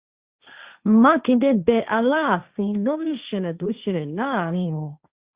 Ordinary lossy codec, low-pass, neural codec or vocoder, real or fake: Opus, 64 kbps; 3.6 kHz; codec, 16 kHz, 1.1 kbps, Voila-Tokenizer; fake